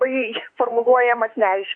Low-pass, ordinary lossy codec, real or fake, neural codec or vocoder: 9.9 kHz; AAC, 48 kbps; fake; autoencoder, 48 kHz, 32 numbers a frame, DAC-VAE, trained on Japanese speech